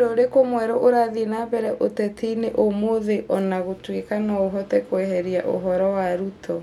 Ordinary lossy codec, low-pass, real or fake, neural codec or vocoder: none; 19.8 kHz; real; none